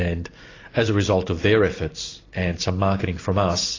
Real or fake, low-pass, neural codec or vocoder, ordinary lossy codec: real; 7.2 kHz; none; AAC, 32 kbps